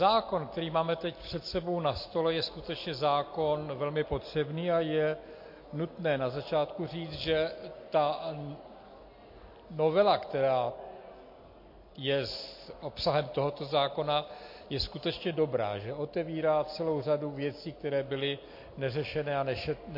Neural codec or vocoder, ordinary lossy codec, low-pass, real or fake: none; MP3, 32 kbps; 5.4 kHz; real